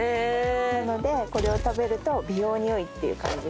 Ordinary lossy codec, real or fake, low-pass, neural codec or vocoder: none; real; none; none